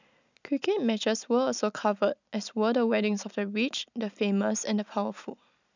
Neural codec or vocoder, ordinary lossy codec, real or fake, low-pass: none; none; real; 7.2 kHz